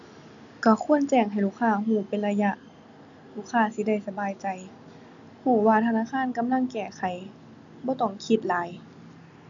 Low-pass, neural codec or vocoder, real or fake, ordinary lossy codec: 7.2 kHz; none; real; none